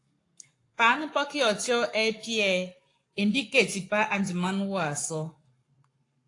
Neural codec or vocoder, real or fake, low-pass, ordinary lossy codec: codec, 44.1 kHz, 7.8 kbps, Pupu-Codec; fake; 10.8 kHz; AAC, 48 kbps